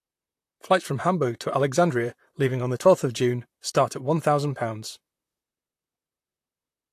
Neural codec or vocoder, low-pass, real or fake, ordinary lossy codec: vocoder, 44.1 kHz, 128 mel bands, Pupu-Vocoder; 14.4 kHz; fake; AAC, 64 kbps